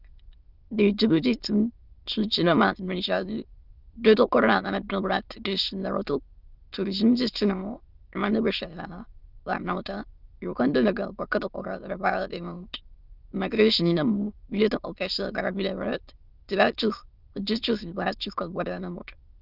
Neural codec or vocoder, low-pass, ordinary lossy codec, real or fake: autoencoder, 22.05 kHz, a latent of 192 numbers a frame, VITS, trained on many speakers; 5.4 kHz; Opus, 32 kbps; fake